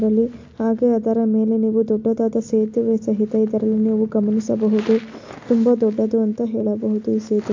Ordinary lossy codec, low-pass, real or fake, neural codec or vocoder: MP3, 48 kbps; 7.2 kHz; real; none